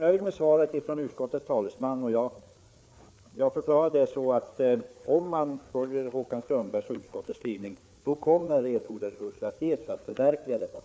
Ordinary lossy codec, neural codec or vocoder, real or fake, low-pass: none; codec, 16 kHz, 4 kbps, FreqCodec, larger model; fake; none